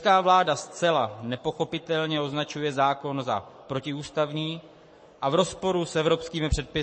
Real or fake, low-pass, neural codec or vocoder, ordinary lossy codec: fake; 9.9 kHz; codec, 44.1 kHz, 7.8 kbps, Pupu-Codec; MP3, 32 kbps